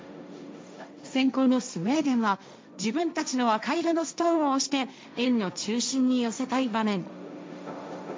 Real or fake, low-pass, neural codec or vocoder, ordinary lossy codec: fake; none; codec, 16 kHz, 1.1 kbps, Voila-Tokenizer; none